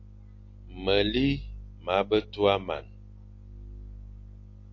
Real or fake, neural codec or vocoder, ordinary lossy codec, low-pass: real; none; AAC, 48 kbps; 7.2 kHz